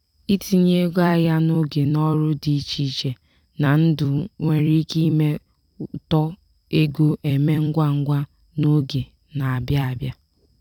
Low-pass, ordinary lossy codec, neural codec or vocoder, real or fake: 19.8 kHz; none; vocoder, 44.1 kHz, 128 mel bands every 256 samples, BigVGAN v2; fake